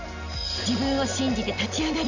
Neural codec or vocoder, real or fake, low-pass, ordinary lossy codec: none; real; 7.2 kHz; none